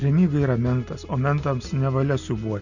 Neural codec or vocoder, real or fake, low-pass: none; real; 7.2 kHz